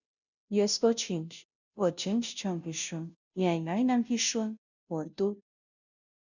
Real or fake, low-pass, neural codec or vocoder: fake; 7.2 kHz; codec, 16 kHz, 0.5 kbps, FunCodec, trained on Chinese and English, 25 frames a second